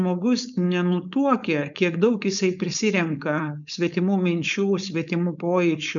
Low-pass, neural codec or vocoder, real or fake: 7.2 kHz; codec, 16 kHz, 4.8 kbps, FACodec; fake